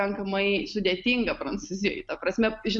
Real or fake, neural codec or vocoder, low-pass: real; none; 10.8 kHz